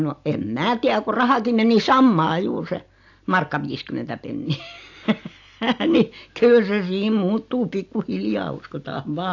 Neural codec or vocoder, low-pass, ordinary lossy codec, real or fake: none; 7.2 kHz; AAC, 48 kbps; real